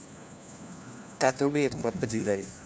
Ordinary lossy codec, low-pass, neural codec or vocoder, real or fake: none; none; codec, 16 kHz, 1 kbps, FunCodec, trained on LibriTTS, 50 frames a second; fake